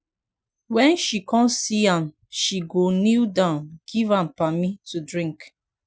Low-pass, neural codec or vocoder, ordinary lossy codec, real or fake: none; none; none; real